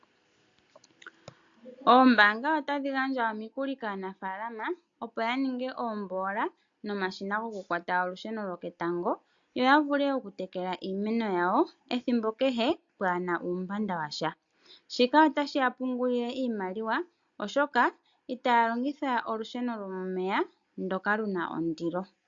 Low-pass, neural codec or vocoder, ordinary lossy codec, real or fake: 7.2 kHz; none; AAC, 64 kbps; real